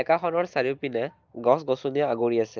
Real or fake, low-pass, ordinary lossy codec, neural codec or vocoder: real; 7.2 kHz; Opus, 24 kbps; none